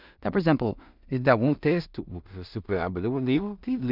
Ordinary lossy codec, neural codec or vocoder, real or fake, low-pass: none; codec, 16 kHz in and 24 kHz out, 0.4 kbps, LongCat-Audio-Codec, two codebook decoder; fake; 5.4 kHz